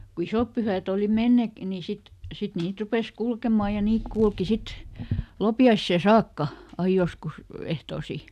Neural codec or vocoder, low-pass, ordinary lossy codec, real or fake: none; 14.4 kHz; none; real